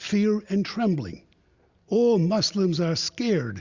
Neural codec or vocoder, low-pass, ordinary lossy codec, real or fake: none; 7.2 kHz; Opus, 64 kbps; real